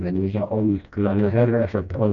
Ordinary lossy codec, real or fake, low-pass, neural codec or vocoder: none; fake; 7.2 kHz; codec, 16 kHz, 1 kbps, FreqCodec, smaller model